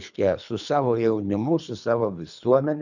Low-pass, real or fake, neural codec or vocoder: 7.2 kHz; fake; codec, 24 kHz, 3 kbps, HILCodec